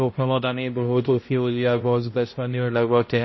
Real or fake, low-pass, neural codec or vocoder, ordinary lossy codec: fake; 7.2 kHz; codec, 16 kHz, 0.5 kbps, X-Codec, HuBERT features, trained on balanced general audio; MP3, 24 kbps